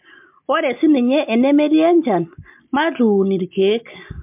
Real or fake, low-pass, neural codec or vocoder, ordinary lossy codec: real; 3.6 kHz; none; MP3, 32 kbps